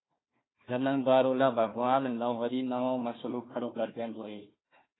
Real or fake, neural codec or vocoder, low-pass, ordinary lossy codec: fake; codec, 16 kHz, 1 kbps, FunCodec, trained on Chinese and English, 50 frames a second; 7.2 kHz; AAC, 16 kbps